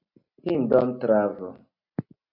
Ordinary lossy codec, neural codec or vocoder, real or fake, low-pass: MP3, 32 kbps; none; real; 5.4 kHz